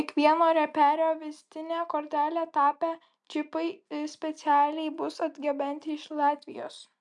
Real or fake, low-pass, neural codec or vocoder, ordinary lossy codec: real; 10.8 kHz; none; MP3, 96 kbps